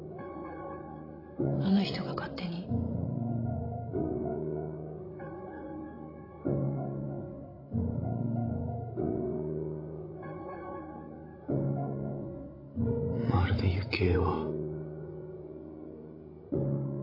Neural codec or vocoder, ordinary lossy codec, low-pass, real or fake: codec, 16 kHz, 16 kbps, FreqCodec, larger model; AAC, 24 kbps; 5.4 kHz; fake